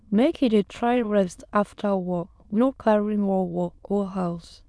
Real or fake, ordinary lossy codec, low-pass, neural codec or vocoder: fake; none; none; autoencoder, 22.05 kHz, a latent of 192 numbers a frame, VITS, trained on many speakers